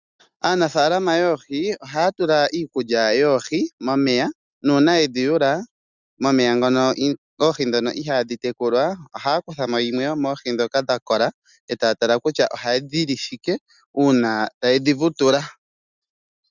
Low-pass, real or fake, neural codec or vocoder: 7.2 kHz; real; none